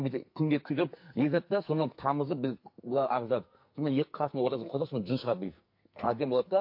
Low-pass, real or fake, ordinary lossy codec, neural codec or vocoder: 5.4 kHz; fake; MP3, 32 kbps; codec, 44.1 kHz, 2.6 kbps, SNAC